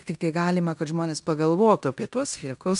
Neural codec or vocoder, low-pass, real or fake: codec, 16 kHz in and 24 kHz out, 0.9 kbps, LongCat-Audio-Codec, fine tuned four codebook decoder; 10.8 kHz; fake